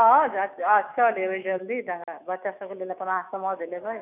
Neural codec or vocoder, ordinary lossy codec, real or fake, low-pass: autoencoder, 48 kHz, 128 numbers a frame, DAC-VAE, trained on Japanese speech; none; fake; 3.6 kHz